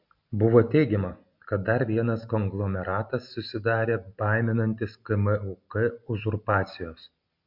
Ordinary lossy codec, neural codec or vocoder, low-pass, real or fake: MP3, 48 kbps; none; 5.4 kHz; real